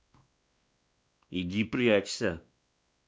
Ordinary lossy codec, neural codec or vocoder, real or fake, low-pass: none; codec, 16 kHz, 2 kbps, X-Codec, WavLM features, trained on Multilingual LibriSpeech; fake; none